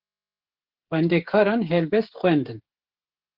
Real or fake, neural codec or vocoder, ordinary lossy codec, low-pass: fake; codec, 16 kHz, 16 kbps, FreqCodec, smaller model; Opus, 16 kbps; 5.4 kHz